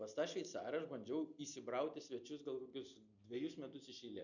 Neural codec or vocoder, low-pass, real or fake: none; 7.2 kHz; real